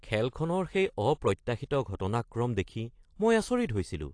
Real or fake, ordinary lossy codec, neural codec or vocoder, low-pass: real; AAC, 48 kbps; none; 9.9 kHz